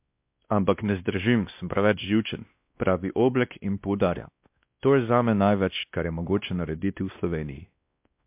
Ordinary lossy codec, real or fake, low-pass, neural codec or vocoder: MP3, 32 kbps; fake; 3.6 kHz; codec, 16 kHz, 1 kbps, X-Codec, WavLM features, trained on Multilingual LibriSpeech